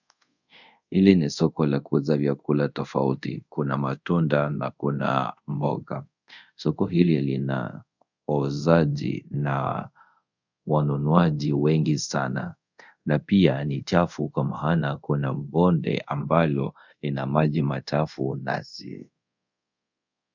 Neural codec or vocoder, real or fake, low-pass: codec, 24 kHz, 0.5 kbps, DualCodec; fake; 7.2 kHz